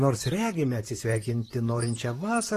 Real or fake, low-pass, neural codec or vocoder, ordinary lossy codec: fake; 14.4 kHz; vocoder, 44.1 kHz, 128 mel bands, Pupu-Vocoder; AAC, 48 kbps